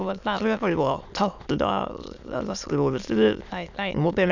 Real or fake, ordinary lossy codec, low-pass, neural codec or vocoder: fake; none; 7.2 kHz; autoencoder, 22.05 kHz, a latent of 192 numbers a frame, VITS, trained on many speakers